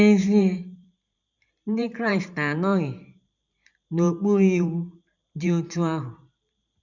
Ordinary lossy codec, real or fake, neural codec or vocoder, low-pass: none; fake; vocoder, 44.1 kHz, 128 mel bands every 512 samples, BigVGAN v2; 7.2 kHz